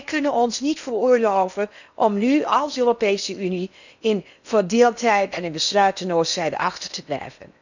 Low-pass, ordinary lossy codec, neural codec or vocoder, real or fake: 7.2 kHz; none; codec, 16 kHz in and 24 kHz out, 0.8 kbps, FocalCodec, streaming, 65536 codes; fake